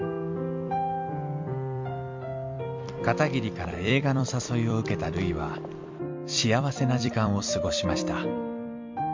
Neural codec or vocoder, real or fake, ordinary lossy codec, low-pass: vocoder, 44.1 kHz, 128 mel bands every 256 samples, BigVGAN v2; fake; MP3, 48 kbps; 7.2 kHz